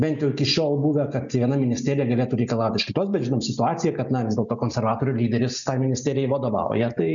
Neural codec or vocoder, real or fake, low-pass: none; real; 7.2 kHz